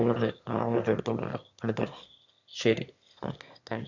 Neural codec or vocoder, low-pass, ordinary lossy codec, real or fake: autoencoder, 22.05 kHz, a latent of 192 numbers a frame, VITS, trained on one speaker; 7.2 kHz; none; fake